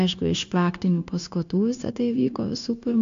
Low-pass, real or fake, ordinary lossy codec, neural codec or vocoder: 7.2 kHz; fake; AAC, 64 kbps; codec, 16 kHz, 0.9 kbps, LongCat-Audio-Codec